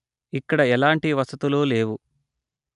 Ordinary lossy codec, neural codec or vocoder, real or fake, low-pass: none; none; real; 10.8 kHz